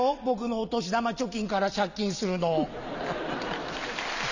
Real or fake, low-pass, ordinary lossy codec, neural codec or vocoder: real; 7.2 kHz; none; none